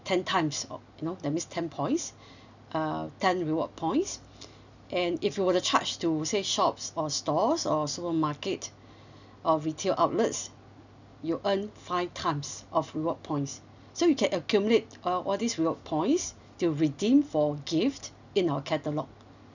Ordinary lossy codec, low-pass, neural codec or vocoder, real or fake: none; 7.2 kHz; none; real